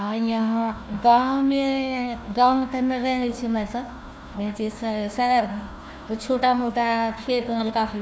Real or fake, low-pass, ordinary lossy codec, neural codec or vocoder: fake; none; none; codec, 16 kHz, 1 kbps, FunCodec, trained on Chinese and English, 50 frames a second